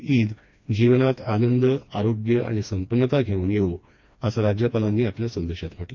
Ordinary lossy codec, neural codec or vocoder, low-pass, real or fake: MP3, 48 kbps; codec, 16 kHz, 2 kbps, FreqCodec, smaller model; 7.2 kHz; fake